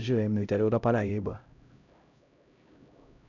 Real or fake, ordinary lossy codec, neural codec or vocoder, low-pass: fake; none; codec, 16 kHz, 0.5 kbps, X-Codec, HuBERT features, trained on LibriSpeech; 7.2 kHz